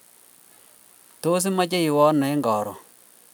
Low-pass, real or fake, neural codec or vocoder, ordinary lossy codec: none; real; none; none